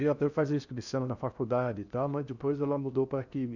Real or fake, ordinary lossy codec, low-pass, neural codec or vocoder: fake; none; 7.2 kHz; codec, 16 kHz in and 24 kHz out, 0.6 kbps, FocalCodec, streaming, 4096 codes